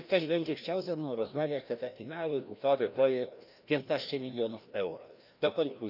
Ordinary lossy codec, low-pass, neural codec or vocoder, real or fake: none; 5.4 kHz; codec, 16 kHz, 1 kbps, FreqCodec, larger model; fake